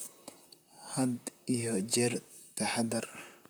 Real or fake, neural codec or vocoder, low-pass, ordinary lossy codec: fake; vocoder, 44.1 kHz, 128 mel bands, Pupu-Vocoder; none; none